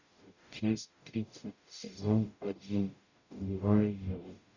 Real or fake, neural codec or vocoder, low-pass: fake; codec, 44.1 kHz, 0.9 kbps, DAC; 7.2 kHz